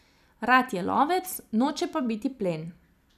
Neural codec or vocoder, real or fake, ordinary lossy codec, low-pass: vocoder, 44.1 kHz, 128 mel bands every 256 samples, BigVGAN v2; fake; none; 14.4 kHz